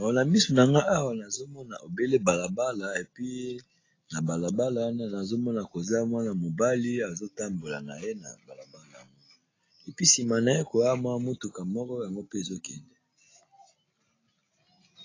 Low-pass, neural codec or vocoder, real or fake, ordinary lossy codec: 7.2 kHz; none; real; AAC, 32 kbps